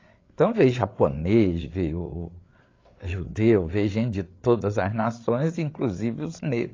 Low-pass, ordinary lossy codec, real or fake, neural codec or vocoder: 7.2 kHz; AAC, 32 kbps; fake; codec, 16 kHz, 8 kbps, FreqCodec, larger model